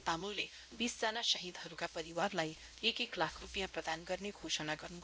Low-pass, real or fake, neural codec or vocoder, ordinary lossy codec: none; fake; codec, 16 kHz, 0.5 kbps, X-Codec, WavLM features, trained on Multilingual LibriSpeech; none